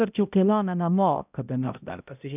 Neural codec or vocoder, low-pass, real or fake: codec, 16 kHz, 0.5 kbps, X-Codec, HuBERT features, trained on balanced general audio; 3.6 kHz; fake